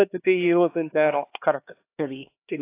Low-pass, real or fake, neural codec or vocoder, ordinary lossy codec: 3.6 kHz; fake; codec, 16 kHz, 2 kbps, X-Codec, HuBERT features, trained on LibriSpeech; AAC, 24 kbps